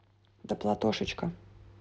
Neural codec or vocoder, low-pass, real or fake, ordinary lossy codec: none; none; real; none